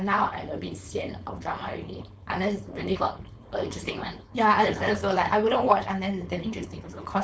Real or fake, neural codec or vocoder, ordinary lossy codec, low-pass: fake; codec, 16 kHz, 4.8 kbps, FACodec; none; none